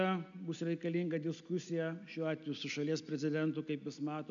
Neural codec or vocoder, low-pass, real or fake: none; 7.2 kHz; real